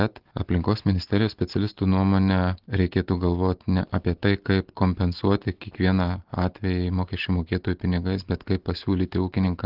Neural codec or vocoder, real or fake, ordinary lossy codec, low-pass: none; real; Opus, 16 kbps; 5.4 kHz